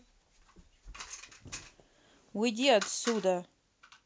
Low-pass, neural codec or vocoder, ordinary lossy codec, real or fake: none; none; none; real